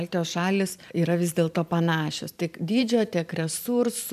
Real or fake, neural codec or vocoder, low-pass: fake; vocoder, 44.1 kHz, 128 mel bands every 512 samples, BigVGAN v2; 14.4 kHz